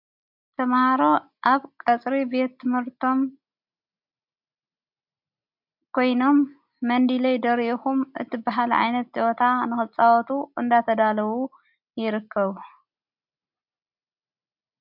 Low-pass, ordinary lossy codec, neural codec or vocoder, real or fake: 5.4 kHz; MP3, 48 kbps; none; real